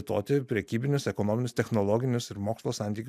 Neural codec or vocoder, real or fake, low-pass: none; real; 14.4 kHz